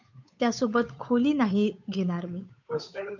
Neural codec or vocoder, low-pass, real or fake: codec, 16 kHz, 8 kbps, FunCodec, trained on Chinese and English, 25 frames a second; 7.2 kHz; fake